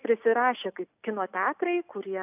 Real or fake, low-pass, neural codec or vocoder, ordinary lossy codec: real; 3.6 kHz; none; AAC, 32 kbps